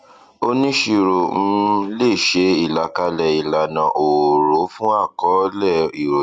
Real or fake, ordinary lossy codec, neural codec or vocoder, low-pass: real; Opus, 64 kbps; none; 9.9 kHz